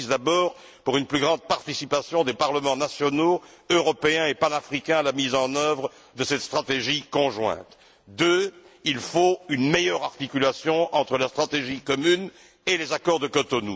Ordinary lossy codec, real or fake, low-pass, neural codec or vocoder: none; real; none; none